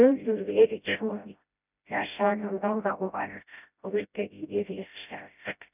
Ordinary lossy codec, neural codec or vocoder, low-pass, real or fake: none; codec, 16 kHz, 0.5 kbps, FreqCodec, smaller model; 3.6 kHz; fake